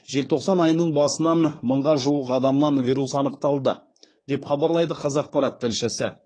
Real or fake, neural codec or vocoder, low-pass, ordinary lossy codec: fake; codec, 24 kHz, 1 kbps, SNAC; 9.9 kHz; AAC, 32 kbps